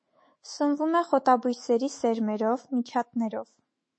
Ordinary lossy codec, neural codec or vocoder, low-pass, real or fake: MP3, 32 kbps; autoencoder, 48 kHz, 128 numbers a frame, DAC-VAE, trained on Japanese speech; 9.9 kHz; fake